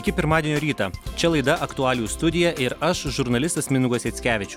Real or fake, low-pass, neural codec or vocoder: real; 19.8 kHz; none